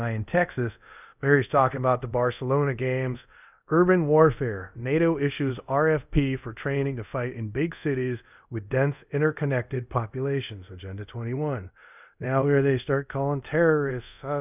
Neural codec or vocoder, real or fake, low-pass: codec, 24 kHz, 0.5 kbps, DualCodec; fake; 3.6 kHz